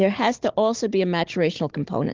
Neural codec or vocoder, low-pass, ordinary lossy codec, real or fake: codec, 16 kHz, 16 kbps, FunCodec, trained on Chinese and English, 50 frames a second; 7.2 kHz; Opus, 16 kbps; fake